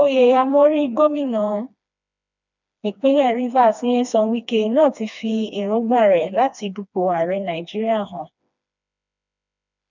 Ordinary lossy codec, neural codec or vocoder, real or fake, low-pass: none; codec, 16 kHz, 2 kbps, FreqCodec, smaller model; fake; 7.2 kHz